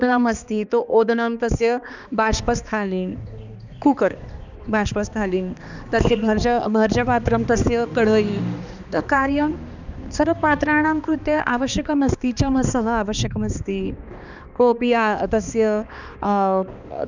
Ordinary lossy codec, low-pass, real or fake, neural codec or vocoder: none; 7.2 kHz; fake; codec, 16 kHz, 2 kbps, X-Codec, HuBERT features, trained on balanced general audio